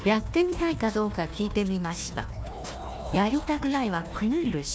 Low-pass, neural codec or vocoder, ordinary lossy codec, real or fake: none; codec, 16 kHz, 1 kbps, FunCodec, trained on Chinese and English, 50 frames a second; none; fake